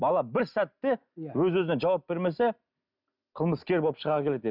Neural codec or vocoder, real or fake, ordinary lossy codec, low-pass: none; real; none; 5.4 kHz